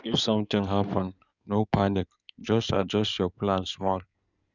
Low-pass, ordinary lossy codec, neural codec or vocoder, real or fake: 7.2 kHz; none; codec, 16 kHz in and 24 kHz out, 2.2 kbps, FireRedTTS-2 codec; fake